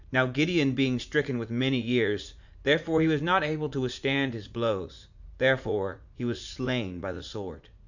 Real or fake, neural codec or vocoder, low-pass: fake; vocoder, 44.1 kHz, 80 mel bands, Vocos; 7.2 kHz